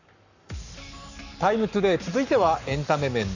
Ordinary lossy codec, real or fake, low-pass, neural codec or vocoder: none; fake; 7.2 kHz; codec, 44.1 kHz, 7.8 kbps, Pupu-Codec